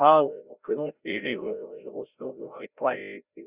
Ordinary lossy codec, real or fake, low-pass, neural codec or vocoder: Opus, 64 kbps; fake; 3.6 kHz; codec, 16 kHz, 0.5 kbps, FreqCodec, larger model